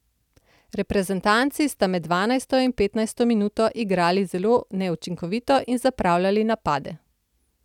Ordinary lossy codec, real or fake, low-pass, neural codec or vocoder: none; real; 19.8 kHz; none